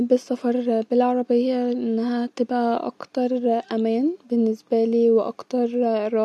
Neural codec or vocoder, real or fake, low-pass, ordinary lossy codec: none; real; none; none